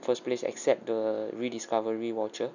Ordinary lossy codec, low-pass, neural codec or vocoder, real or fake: none; 7.2 kHz; none; real